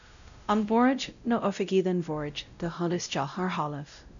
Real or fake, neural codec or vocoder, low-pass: fake; codec, 16 kHz, 0.5 kbps, X-Codec, WavLM features, trained on Multilingual LibriSpeech; 7.2 kHz